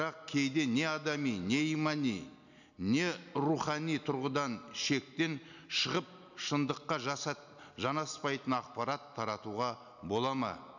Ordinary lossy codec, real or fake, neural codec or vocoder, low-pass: none; real; none; 7.2 kHz